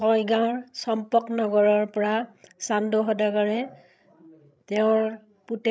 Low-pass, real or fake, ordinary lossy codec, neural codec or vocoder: none; fake; none; codec, 16 kHz, 16 kbps, FreqCodec, larger model